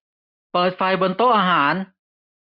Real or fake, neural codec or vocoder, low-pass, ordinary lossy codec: real; none; 5.4 kHz; none